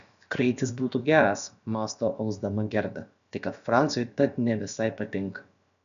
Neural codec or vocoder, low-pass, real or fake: codec, 16 kHz, about 1 kbps, DyCAST, with the encoder's durations; 7.2 kHz; fake